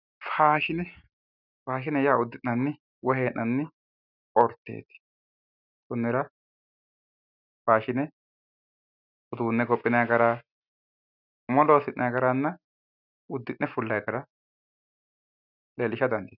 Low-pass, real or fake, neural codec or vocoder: 5.4 kHz; real; none